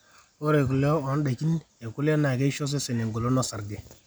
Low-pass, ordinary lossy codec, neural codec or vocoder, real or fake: none; none; none; real